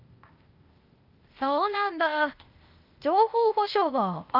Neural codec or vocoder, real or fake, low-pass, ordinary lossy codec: codec, 16 kHz, 0.8 kbps, ZipCodec; fake; 5.4 kHz; Opus, 24 kbps